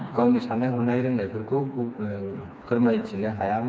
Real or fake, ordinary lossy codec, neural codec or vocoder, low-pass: fake; none; codec, 16 kHz, 2 kbps, FreqCodec, smaller model; none